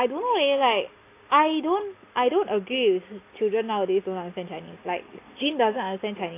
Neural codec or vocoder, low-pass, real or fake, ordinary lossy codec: none; 3.6 kHz; real; AAC, 24 kbps